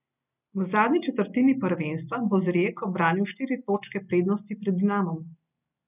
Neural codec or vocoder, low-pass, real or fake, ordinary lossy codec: none; 3.6 kHz; real; none